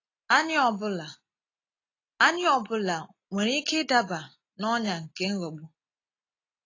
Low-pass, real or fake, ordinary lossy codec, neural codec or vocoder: 7.2 kHz; real; AAC, 32 kbps; none